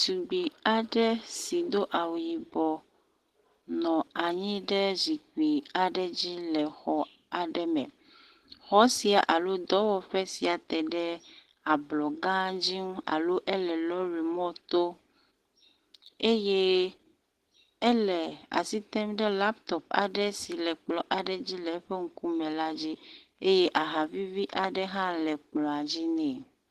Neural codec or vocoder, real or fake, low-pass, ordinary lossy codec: none; real; 14.4 kHz; Opus, 16 kbps